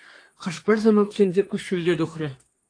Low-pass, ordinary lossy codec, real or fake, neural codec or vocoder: 9.9 kHz; AAC, 48 kbps; fake; codec, 24 kHz, 1 kbps, SNAC